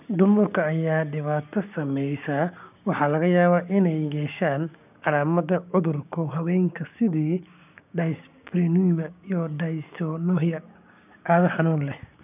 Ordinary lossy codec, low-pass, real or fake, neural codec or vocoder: none; 3.6 kHz; fake; codec, 16 kHz, 6 kbps, DAC